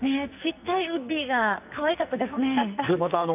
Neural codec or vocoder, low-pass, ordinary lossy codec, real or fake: codec, 44.1 kHz, 2.6 kbps, SNAC; 3.6 kHz; none; fake